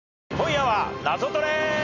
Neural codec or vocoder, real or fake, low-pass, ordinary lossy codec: none; real; 7.2 kHz; none